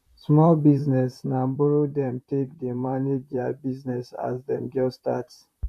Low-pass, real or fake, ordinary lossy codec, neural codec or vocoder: 14.4 kHz; fake; MP3, 64 kbps; vocoder, 44.1 kHz, 128 mel bands, Pupu-Vocoder